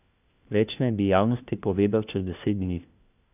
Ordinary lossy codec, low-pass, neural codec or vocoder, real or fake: none; 3.6 kHz; codec, 16 kHz, 1 kbps, FunCodec, trained on LibriTTS, 50 frames a second; fake